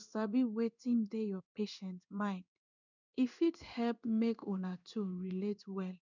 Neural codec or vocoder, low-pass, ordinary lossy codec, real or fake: codec, 16 kHz in and 24 kHz out, 1 kbps, XY-Tokenizer; 7.2 kHz; none; fake